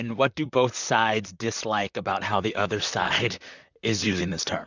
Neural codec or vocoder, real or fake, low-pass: vocoder, 44.1 kHz, 128 mel bands, Pupu-Vocoder; fake; 7.2 kHz